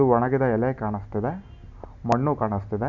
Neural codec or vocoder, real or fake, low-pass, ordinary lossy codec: none; real; 7.2 kHz; none